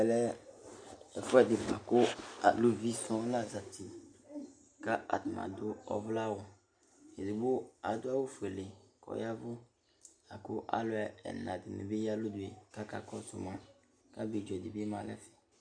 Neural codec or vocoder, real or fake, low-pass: none; real; 9.9 kHz